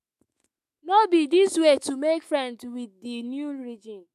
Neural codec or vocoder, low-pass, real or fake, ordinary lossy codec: autoencoder, 48 kHz, 128 numbers a frame, DAC-VAE, trained on Japanese speech; 14.4 kHz; fake; MP3, 96 kbps